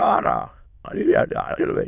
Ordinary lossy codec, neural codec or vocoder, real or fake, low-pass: none; autoencoder, 22.05 kHz, a latent of 192 numbers a frame, VITS, trained on many speakers; fake; 3.6 kHz